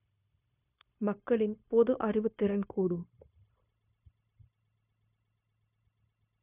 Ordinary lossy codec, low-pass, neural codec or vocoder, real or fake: none; 3.6 kHz; codec, 16 kHz, 0.9 kbps, LongCat-Audio-Codec; fake